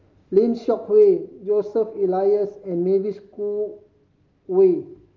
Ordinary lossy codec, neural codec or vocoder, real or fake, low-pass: Opus, 32 kbps; none; real; 7.2 kHz